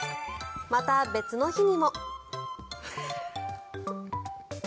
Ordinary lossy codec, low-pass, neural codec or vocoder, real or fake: none; none; none; real